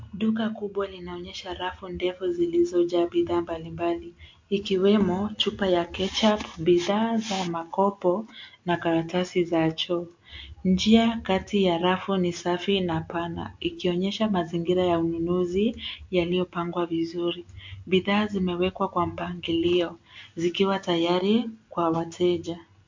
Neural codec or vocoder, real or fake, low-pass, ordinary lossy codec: none; real; 7.2 kHz; MP3, 48 kbps